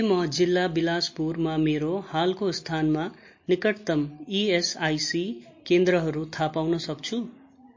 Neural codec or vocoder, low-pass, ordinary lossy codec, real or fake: none; 7.2 kHz; MP3, 32 kbps; real